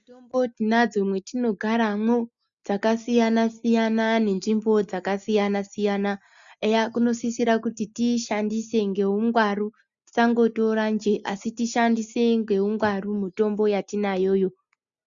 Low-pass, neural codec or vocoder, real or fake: 7.2 kHz; none; real